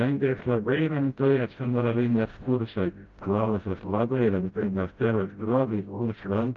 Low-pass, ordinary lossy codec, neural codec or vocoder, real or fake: 7.2 kHz; Opus, 32 kbps; codec, 16 kHz, 0.5 kbps, FreqCodec, smaller model; fake